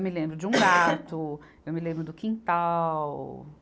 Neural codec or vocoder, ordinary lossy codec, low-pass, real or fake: none; none; none; real